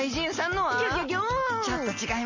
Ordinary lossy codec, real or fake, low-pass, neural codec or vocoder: MP3, 32 kbps; real; 7.2 kHz; none